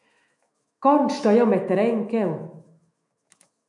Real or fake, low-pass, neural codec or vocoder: fake; 10.8 kHz; autoencoder, 48 kHz, 128 numbers a frame, DAC-VAE, trained on Japanese speech